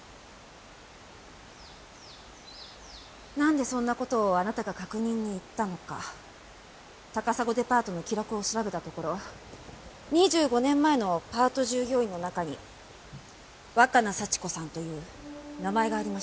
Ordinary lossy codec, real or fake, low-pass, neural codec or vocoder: none; real; none; none